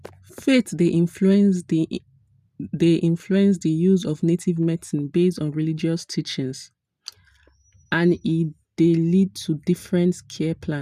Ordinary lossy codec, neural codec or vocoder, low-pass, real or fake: none; none; 14.4 kHz; real